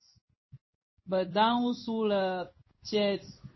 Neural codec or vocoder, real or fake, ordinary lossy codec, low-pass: codec, 16 kHz in and 24 kHz out, 1 kbps, XY-Tokenizer; fake; MP3, 24 kbps; 7.2 kHz